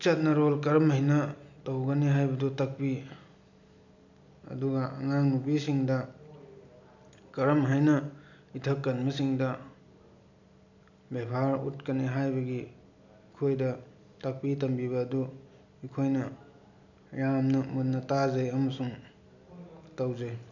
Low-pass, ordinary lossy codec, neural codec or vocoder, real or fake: 7.2 kHz; none; none; real